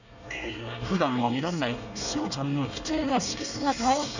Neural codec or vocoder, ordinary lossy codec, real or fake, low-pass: codec, 24 kHz, 1 kbps, SNAC; none; fake; 7.2 kHz